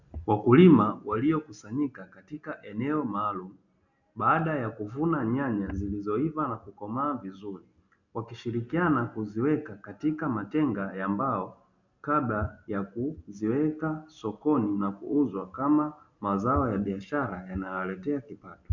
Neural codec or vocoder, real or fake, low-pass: none; real; 7.2 kHz